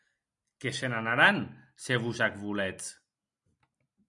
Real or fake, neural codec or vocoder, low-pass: real; none; 10.8 kHz